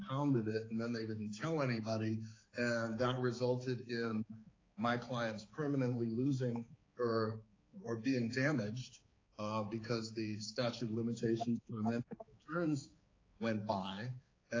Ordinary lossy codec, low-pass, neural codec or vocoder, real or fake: AAC, 32 kbps; 7.2 kHz; codec, 16 kHz, 4 kbps, X-Codec, HuBERT features, trained on balanced general audio; fake